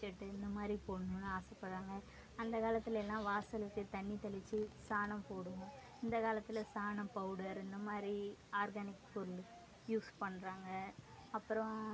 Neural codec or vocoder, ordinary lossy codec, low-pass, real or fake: none; none; none; real